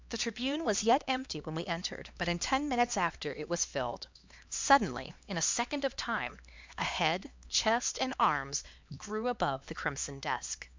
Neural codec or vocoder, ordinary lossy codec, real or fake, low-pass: codec, 16 kHz, 2 kbps, X-Codec, HuBERT features, trained on LibriSpeech; MP3, 64 kbps; fake; 7.2 kHz